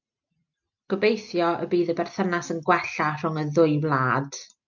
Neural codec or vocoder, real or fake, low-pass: none; real; 7.2 kHz